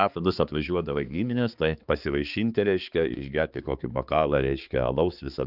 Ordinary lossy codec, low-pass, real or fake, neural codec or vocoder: Opus, 24 kbps; 5.4 kHz; fake; codec, 16 kHz, 4 kbps, X-Codec, HuBERT features, trained on balanced general audio